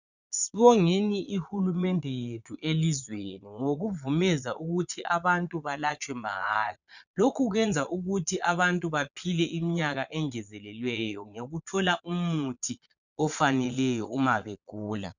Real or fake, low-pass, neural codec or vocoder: fake; 7.2 kHz; vocoder, 22.05 kHz, 80 mel bands, Vocos